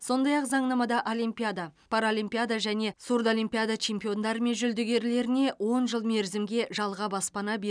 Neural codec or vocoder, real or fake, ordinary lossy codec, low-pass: none; real; none; 9.9 kHz